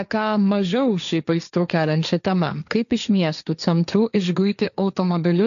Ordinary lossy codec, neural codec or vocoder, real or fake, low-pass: MP3, 96 kbps; codec, 16 kHz, 1.1 kbps, Voila-Tokenizer; fake; 7.2 kHz